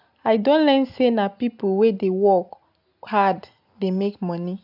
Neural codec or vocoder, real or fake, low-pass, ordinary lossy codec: none; real; 5.4 kHz; AAC, 48 kbps